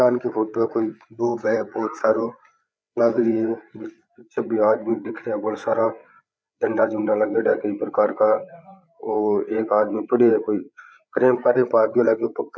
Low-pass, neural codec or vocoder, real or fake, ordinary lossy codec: none; codec, 16 kHz, 8 kbps, FreqCodec, larger model; fake; none